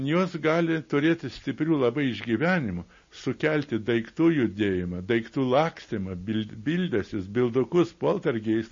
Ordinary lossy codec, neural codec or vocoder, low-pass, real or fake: MP3, 32 kbps; none; 7.2 kHz; real